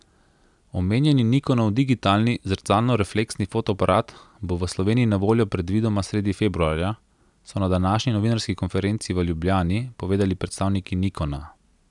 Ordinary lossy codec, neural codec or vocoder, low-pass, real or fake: none; none; 10.8 kHz; real